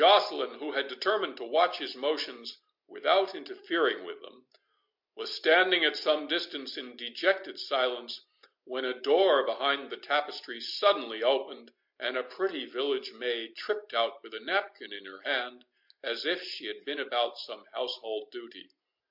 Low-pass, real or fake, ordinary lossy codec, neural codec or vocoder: 5.4 kHz; real; MP3, 48 kbps; none